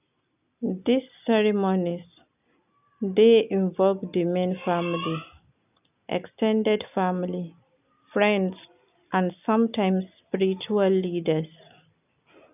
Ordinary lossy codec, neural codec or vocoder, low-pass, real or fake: none; none; 3.6 kHz; real